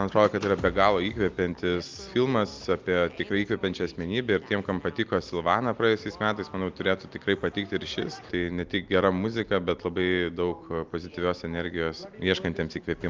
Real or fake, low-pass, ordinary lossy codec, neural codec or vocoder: real; 7.2 kHz; Opus, 32 kbps; none